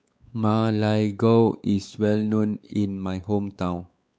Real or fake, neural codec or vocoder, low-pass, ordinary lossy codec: fake; codec, 16 kHz, 4 kbps, X-Codec, WavLM features, trained on Multilingual LibriSpeech; none; none